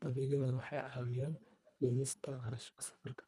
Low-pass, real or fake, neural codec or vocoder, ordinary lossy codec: none; fake; codec, 24 kHz, 1.5 kbps, HILCodec; none